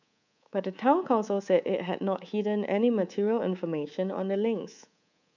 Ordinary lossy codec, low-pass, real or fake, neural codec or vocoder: none; 7.2 kHz; fake; codec, 24 kHz, 3.1 kbps, DualCodec